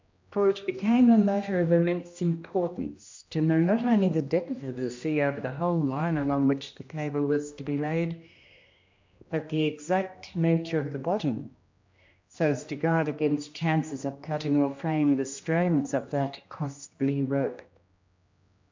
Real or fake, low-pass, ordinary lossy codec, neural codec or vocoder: fake; 7.2 kHz; MP3, 64 kbps; codec, 16 kHz, 1 kbps, X-Codec, HuBERT features, trained on general audio